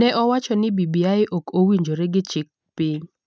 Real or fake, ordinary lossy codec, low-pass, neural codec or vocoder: real; none; none; none